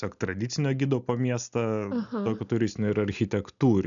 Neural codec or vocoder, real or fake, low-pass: none; real; 7.2 kHz